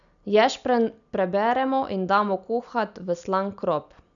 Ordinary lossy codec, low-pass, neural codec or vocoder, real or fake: none; 7.2 kHz; none; real